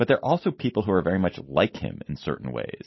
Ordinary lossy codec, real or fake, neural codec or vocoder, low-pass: MP3, 24 kbps; real; none; 7.2 kHz